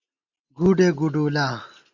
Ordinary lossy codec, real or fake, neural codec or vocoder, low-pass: Opus, 64 kbps; real; none; 7.2 kHz